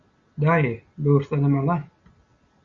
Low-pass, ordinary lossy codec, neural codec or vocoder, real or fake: 7.2 kHz; Opus, 64 kbps; none; real